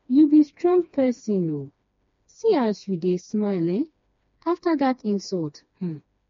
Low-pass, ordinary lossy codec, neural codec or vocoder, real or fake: 7.2 kHz; MP3, 48 kbps; codec, 16 kHz, 2 kbps, FreqCodec, smaller model; fake